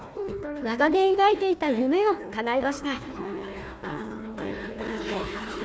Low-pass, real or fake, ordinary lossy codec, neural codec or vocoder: none; fake; none; codec, 16 kHz, 1 kbps, FunCodec, trained on Chinese and English, 50 frames a second